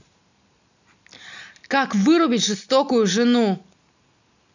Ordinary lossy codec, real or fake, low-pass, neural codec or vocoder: none; real; 7.2 kHz; none